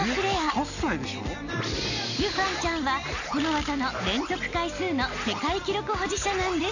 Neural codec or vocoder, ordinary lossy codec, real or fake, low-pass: none; none; real; 7.2 kHz